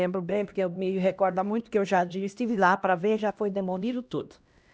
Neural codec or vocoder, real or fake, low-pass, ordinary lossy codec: codec, 16 kHz, 1 kbps, X-Codec, HuBERT features, trained on LibriSpeech; fake; none; none